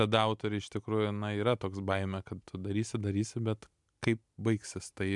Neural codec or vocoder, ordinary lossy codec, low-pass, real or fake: none; MP3, 96 kbps; 10.8 kHz; real